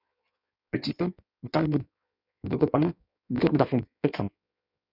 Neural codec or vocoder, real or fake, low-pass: codec, 16 kHz in and 24 kHz out, 1.1 kbps, FireRedTTS-2 codec; fake; 5.4 kHz